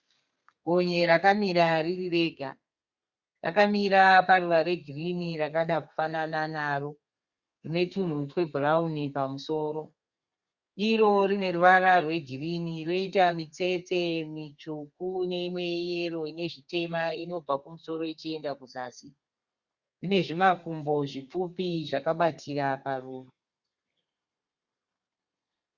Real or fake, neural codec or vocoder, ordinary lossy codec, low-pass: fake; codec, 32 kHz, 1.9 kbps, SNAC; Opus, 64 kbps; 7.2 kHz